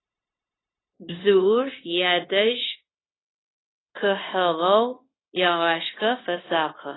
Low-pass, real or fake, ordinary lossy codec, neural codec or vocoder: 7.2 kHz; fake; AAC, 16 kbps; codec, 16 kHz, 0.9 kbps, LongCat-Audio-Codec